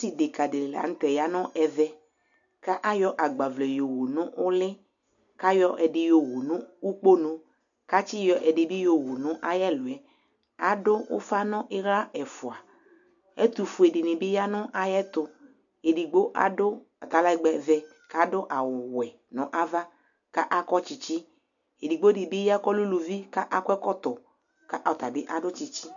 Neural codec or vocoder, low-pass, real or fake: none; 7.2 kHz; real